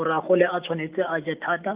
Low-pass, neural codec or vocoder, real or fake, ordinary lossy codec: 3.6 kHz; none; real; Opus, 24 kbps